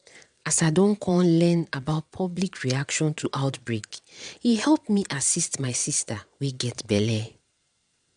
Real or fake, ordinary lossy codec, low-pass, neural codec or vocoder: fake; none; 9.9 kHz; vocoder, 22.05 kHz, 80 mel bands, Vocos